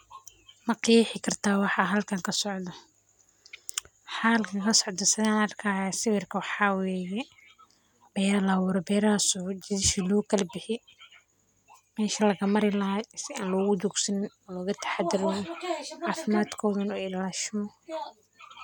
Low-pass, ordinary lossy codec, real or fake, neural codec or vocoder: 19.8 kHz; none; real; none